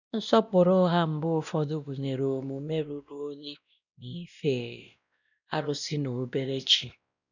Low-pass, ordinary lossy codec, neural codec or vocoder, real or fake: 7.2 kHz; none; codec, 16 kHz, 1 kbps, X-Codec, WavLM features, trained on Multilingual LibriSpeech; fake